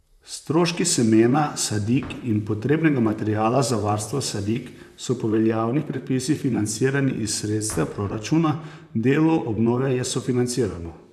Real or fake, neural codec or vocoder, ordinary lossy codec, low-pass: fake; vocoder, 44.1 kHz, 128 mel bands, Pupu-Vocoder; none; 14.4 kHz